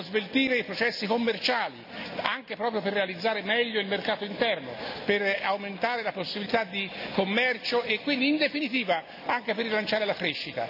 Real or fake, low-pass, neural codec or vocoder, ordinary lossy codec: real; 5.4 kHz; none; none